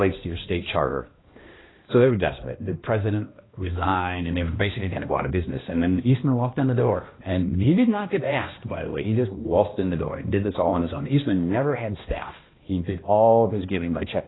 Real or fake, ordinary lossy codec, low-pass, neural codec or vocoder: fake; AAC, 16 kbps; 7.2 kHz; codec, 16 kHz, 1 kbps, X-Codec, HuBERT features, trained on balanced general audio